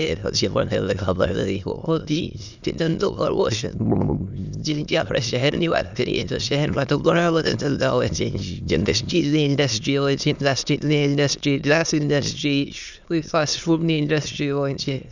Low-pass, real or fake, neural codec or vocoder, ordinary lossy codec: 7.2 kHz; fake; autoencoder, 22.05 kHz, a latent of 192 numbers a frame, VITS, trained on many speakers; none